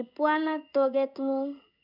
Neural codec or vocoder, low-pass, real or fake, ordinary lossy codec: codec, 16 kHz in and 24 kHz out, 1 kbps, XY-Tokenizer; 5.4 kHz; fake; none